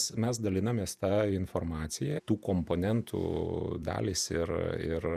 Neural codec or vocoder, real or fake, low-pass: vocoder, 48 kHz, 128 mel bands, Vocos; fake; 14.4 kHz